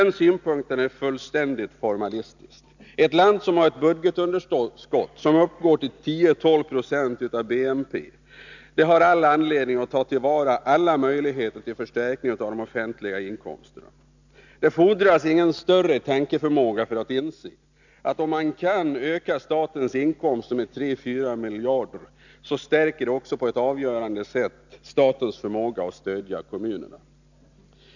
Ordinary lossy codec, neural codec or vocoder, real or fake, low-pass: none; none; real; 7.2 kHz